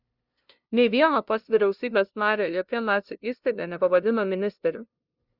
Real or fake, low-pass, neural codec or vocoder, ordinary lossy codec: fake; 5.4 kHz; codec, 16 kHz, 0.5 kbps, FunCodec, trained on LibriTTS, 25 frames a second; Opus, 64 kbps